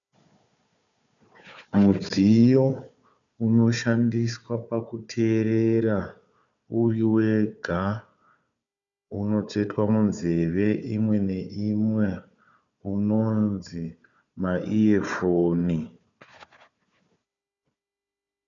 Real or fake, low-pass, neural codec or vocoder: fake; 7.2 kHz; codec, 16 kHz, 4 kbps, FunCodec, trained on Chinese and English, 50 frames a second